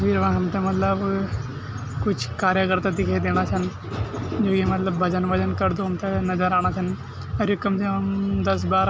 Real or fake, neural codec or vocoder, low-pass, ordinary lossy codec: real; none; none; none